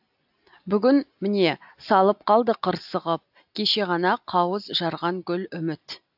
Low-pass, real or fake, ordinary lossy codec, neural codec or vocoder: 5.4 kHz; real; AAC, 48 kbps; none